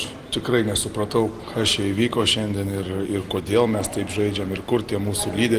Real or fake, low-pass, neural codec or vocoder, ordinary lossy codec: real; 14.4 kHz; none; Opus, 24 kbps